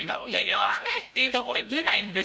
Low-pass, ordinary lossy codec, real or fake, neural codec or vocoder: none; none; fake; codec, 16 kHz, 0.5 kbps, FreqCodec, larger model